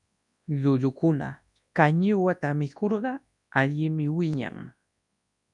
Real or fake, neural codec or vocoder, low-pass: fake; codec, 24 kHz, 0.9 kbps, WavTokenizer, large speech release; 10.8 kHz